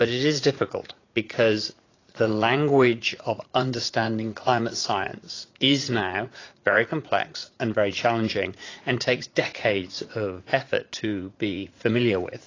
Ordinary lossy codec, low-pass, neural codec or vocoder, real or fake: AAC, 32 kbps; 7.2 kHz; vocoder, 44.1 kHz, 80 mel bands, Vocos; fake